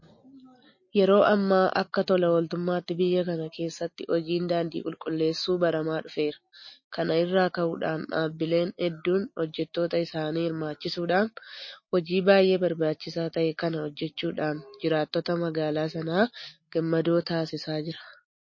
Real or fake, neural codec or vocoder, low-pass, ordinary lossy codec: real; none; 7.2 kHz; MP3, 32 kbps